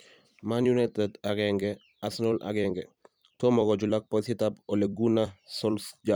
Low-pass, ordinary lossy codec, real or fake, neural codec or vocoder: none; none; fake; vocoder, 44.1 kHz, 128 mel bands every 256 samples, BigVGAN v2